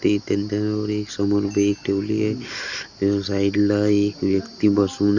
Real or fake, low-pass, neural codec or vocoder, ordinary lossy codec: real; 7.2 kHz; none; Opus, 64 kbps